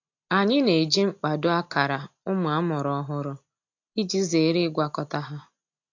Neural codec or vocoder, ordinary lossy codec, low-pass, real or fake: none; AAC, 48 kbps; 7.2 kHz; real